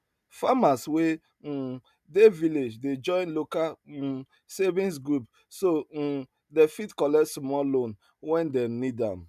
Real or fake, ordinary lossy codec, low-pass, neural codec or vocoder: real; none; 14.4 kHz; none